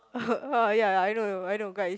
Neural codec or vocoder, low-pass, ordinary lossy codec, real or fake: none; none; none; real